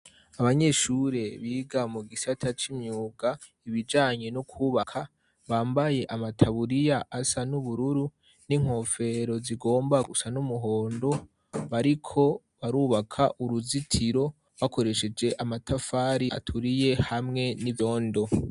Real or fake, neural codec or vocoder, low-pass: real; none; 10.8 kHz